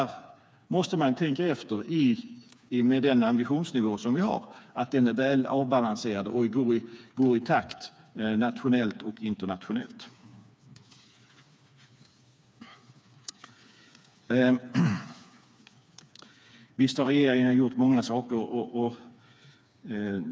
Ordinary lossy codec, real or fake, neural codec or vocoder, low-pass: none; fake; codec, 16 kHz, 4 kbps, FreqCodec, smaller model; none